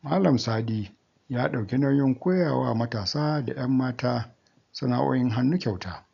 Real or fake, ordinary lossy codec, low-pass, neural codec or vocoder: real; none; 7.2 kHz; none